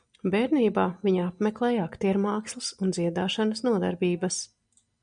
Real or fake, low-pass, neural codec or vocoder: real; 9.9 kHz; none